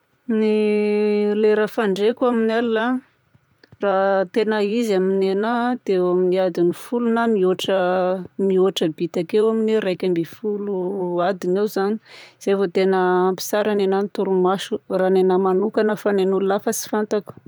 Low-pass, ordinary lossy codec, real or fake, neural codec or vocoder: none; none; fake; vocoder, 44.1 kHz, 128 mel bands, Pupu-Vocoder